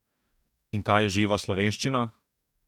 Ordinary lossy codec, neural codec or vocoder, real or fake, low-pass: none; codec, 44.1 kHz, 2.6 kbps, DAC; fake; 19.8 kHz